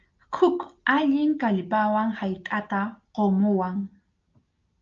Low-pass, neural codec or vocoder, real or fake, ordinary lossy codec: 7.2 kHz; none; real; Opus, 24 kbps